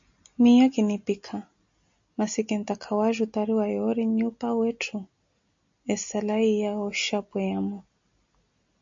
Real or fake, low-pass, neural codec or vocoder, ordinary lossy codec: real; 7.2 kHz; none; MP3, 48 kbps